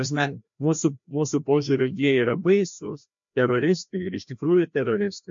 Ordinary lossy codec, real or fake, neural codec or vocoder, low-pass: MP3, 48 kbps; fake; codec, 16 kHz, 1 kbps, FreqCodec, larger model; 7.2 kHz